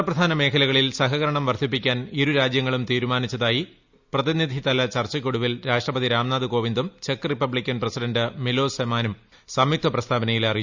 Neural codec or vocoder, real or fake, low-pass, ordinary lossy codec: none; real; 7.2 kHz; Opus, 64 kbps